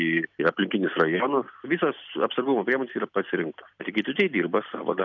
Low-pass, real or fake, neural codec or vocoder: 7.2 kHz; real; none